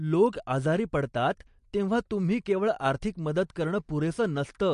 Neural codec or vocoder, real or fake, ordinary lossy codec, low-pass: none; real; MP3, 48 kbps; 14.4 kHz